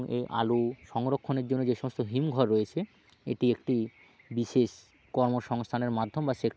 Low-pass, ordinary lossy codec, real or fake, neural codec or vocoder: none; none; real; none